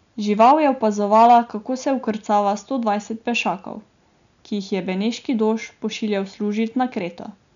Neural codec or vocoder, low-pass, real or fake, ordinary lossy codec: none; 7.2 kHz; real; none